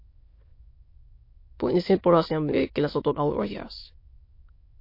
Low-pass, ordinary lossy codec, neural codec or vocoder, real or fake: 5.4 kHz; MP3, 32 kbps; autoencoder, 22.05 kHz, a latent of 192 numbers a frame, VITS, trained on many speakers; fake